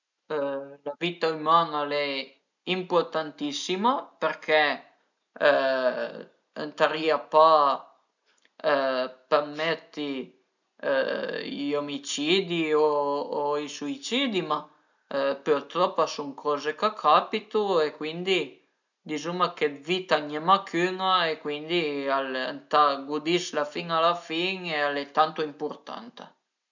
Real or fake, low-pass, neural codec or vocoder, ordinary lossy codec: real; 7.2 kHz; none; none